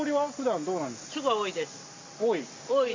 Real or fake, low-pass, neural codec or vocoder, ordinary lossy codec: real; 7.2 kHz; none; MP3, 48 kbps